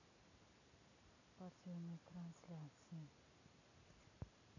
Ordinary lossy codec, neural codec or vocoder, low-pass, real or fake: none; none; 7.2 kHz; real